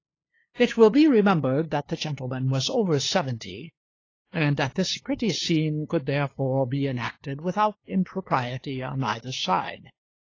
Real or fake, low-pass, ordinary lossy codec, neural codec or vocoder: fake; 7.2 kHz; AAC, 32 kbps; codec, 16 kHz, 2 kbps, FunCodec, trained on LibriTTS, 25 frames a second